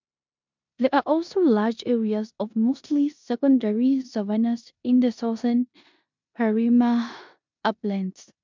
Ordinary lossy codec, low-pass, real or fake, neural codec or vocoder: MP3, 64 kbps; 7.2 kHz; fake; codec, 16 kHz in and 24 kHz out, 0.9 kbps, LongCat-Audio-Codec, four codebook decoder